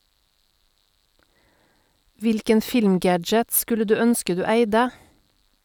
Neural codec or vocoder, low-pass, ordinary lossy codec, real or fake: none; 19.8 kHz; none; real